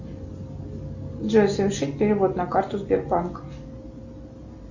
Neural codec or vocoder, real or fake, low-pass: none; real; 7.2 kHz